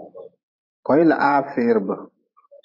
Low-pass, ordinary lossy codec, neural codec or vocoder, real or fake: 5.4 kHz; AAC, 32 kbps; vocoder, 44.1 kHz, 128 mel bands every 512 samples, BigVGAN v2; fake